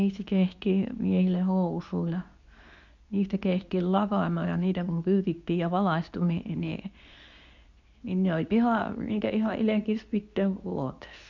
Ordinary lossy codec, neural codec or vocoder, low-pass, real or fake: none; codec, 24 kHz, 0.9 kbps, WavTokenizer, medium speech release version 2; 7.2 kHz; fake